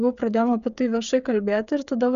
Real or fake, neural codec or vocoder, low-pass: fake; codec, 16 kHz, 8 kbps, FreqCodec, smaller model; 7.2 kHz